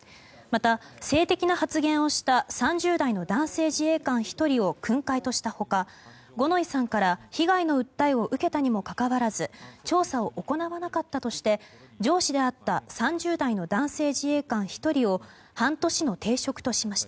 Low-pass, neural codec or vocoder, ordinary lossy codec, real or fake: none; none; none; real